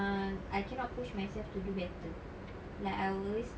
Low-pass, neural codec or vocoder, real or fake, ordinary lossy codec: none; none; real; none